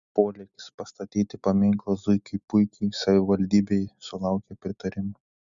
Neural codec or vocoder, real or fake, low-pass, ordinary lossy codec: none; real; 7.2 kHz; Opus, 64 kbps